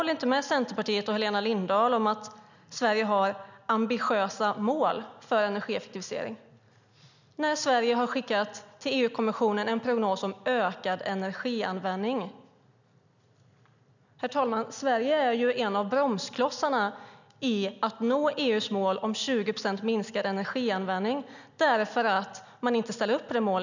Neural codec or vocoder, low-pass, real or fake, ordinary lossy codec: none; 7.2 kHz; real; none